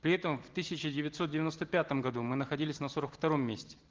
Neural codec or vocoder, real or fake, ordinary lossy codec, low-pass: none; real; Opus, 16 kbps; 7.2 kHz